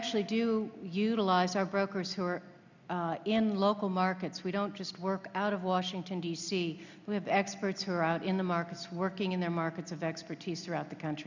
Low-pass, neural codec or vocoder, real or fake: 7.2 kHz; none; real